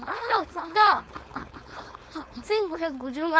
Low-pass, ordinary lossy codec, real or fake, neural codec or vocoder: none; none; fake; codec, 16 kHz, 4.8 kbps, FACodec